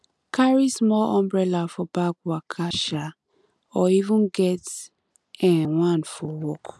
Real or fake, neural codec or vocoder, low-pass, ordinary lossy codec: real; none; none; none